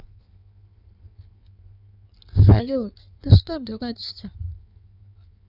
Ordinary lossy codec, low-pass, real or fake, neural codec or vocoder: none; 5.4 kHz; fake; codec, 16 kHz in and 24 kHz out, 1.1 kbps, FireRedTTS-2 codec